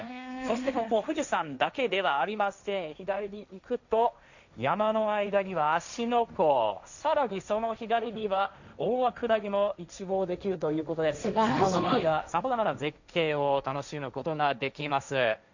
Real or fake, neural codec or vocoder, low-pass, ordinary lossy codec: fake; codec, 16 kHz, 1.1 kbps, Voila-Tokenizer; 7.2 kHz; none